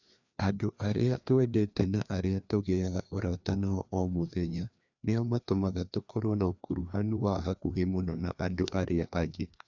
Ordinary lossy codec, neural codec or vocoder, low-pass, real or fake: none; codec, 16 kHz, 2 kbps, FreqCodec, larger model; 7.2 kHz; fake